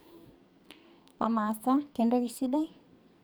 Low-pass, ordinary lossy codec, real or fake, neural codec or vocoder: none; none; fake; codec, 44.1 kHz, 2.6 kbps, SNAC